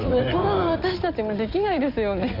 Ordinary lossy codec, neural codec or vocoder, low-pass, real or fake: MP3, 48 kbps; codec, 16 kHz in and 24 kHz out, 2.2 kbps, FireRedTTS-2 codec; 5.4 kHz; fake